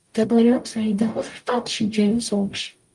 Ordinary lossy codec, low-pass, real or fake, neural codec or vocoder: Opus, 32 kbps; 10.8 kHz; fake; codec, 44.1 kHz, 0.9 kbps, DAC